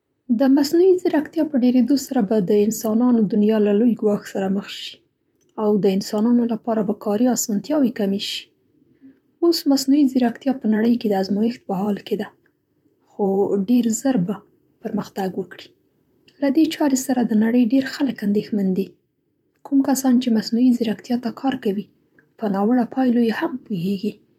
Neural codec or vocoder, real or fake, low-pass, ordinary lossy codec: vocoder, 44.1 kHz, 128 mel bands, Pupu-Vocoder; fake; 19.8 kHz; none